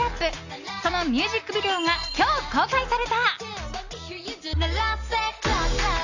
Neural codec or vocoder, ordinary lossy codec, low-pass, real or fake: none; none; 7.2 kHz; real